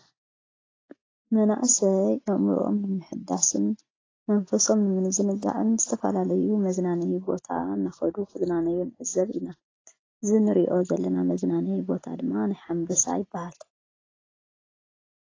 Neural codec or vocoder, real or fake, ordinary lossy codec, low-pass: none; real; AAC, 32 kbps; 7.2 kHz